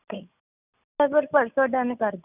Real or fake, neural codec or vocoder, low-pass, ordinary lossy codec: real; none; 3.6 kHz; none